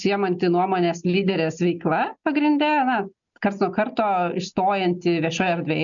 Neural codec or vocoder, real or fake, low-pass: none; real; 7.2 kHz